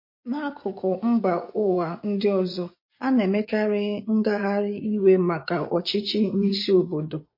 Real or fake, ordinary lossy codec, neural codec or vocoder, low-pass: fake; MP3, 32 kbps; vocoder, 24 kHz, 100 mel bands, Vocos; 5.4 kHz